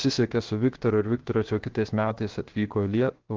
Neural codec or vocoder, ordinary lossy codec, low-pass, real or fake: codec, 16 kHz, 0.7 kbps, FocalCodec; Opus, 16 kbps; 7.2 kHz; fake